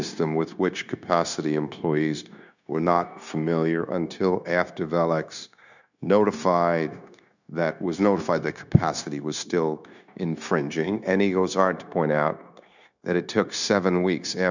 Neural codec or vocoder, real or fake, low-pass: codec, 16 kHz, 0.9 kbps, LongCat-Audio-Codec; fake; 7.2 kHz